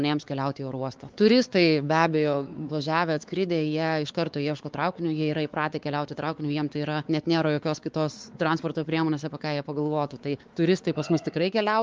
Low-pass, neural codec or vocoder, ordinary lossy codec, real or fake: 7.2 kHz; codec, 16 kHz, 4 kbps, X-Codec, WavLM features, trained on Multilingual LibriSpeech; Opus, 32 kbps; fake